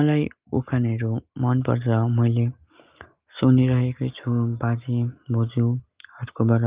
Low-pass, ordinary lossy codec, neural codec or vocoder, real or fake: 3.6 kHz; Opus, 32 kbps; none; real